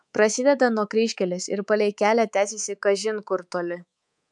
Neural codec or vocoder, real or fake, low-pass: codec, 24 kHz, 3.1 kbps, DualCodec; fake; 10.8 kHz